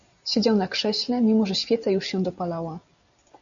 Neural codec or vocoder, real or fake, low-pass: none; real; 7.2 kHz